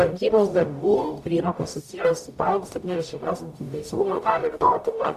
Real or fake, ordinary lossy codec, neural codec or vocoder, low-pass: fake; Opus, 64 kbps; codec, 44.1 kHz, 0.9 kbps, DAC; 14.4 kHz